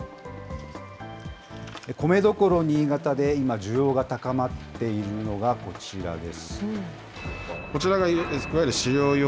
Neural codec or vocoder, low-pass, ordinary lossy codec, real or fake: none; none; none; real